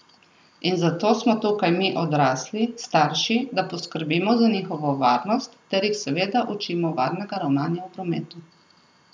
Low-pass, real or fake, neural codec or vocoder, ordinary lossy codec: none; real; none; none